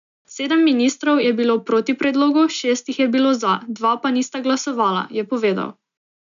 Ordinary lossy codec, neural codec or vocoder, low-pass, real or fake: none; none; 7.2 kHz; real